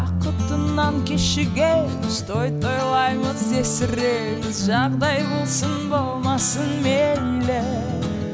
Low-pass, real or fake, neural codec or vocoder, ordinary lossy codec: none; real; none; none